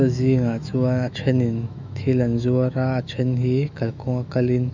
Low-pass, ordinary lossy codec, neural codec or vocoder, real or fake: 7.2 kHz; none; autoencoder, 48 kHz, 128 numbers a frame, DAC-VAE, trained on Japanese speech; fake